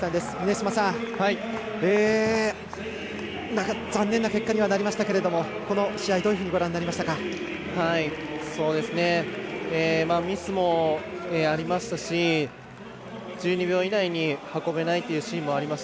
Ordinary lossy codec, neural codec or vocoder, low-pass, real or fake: none; none; none; real